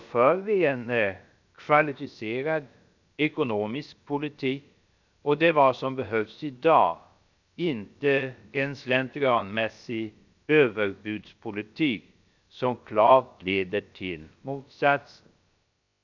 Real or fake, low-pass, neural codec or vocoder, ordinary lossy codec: fake; 7.2 kHz; codec, 16 kHz, about 1 kbps, DyCAST, with the encoder's durations; none